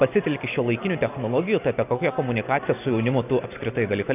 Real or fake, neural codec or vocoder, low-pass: real; none; 3.6 kHz